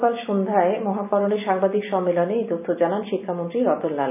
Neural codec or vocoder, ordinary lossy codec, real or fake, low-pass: none; none; real; 3.6 kHz